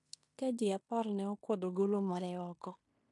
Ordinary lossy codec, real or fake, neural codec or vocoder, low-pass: MP3, 64 kbps; fake; codec, 16 kHz in and 24 kHz out, 0.9 kbps, LongCat-Audio-Codec, fine tuned four codebook decoder; 10.8 kHz